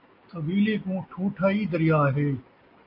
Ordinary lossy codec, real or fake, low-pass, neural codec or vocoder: MP3, 32 kbps; real; 5.4 kHz; none